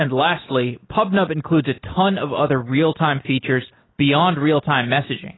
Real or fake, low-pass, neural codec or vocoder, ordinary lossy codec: real; 7.2 kHz; none; AAC, 16 kbps